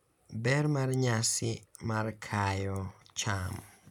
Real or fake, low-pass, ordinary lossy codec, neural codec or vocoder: real; 19.8 kHz; none; none